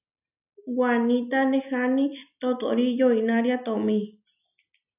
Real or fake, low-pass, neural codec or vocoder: real; 3.6 kHz; none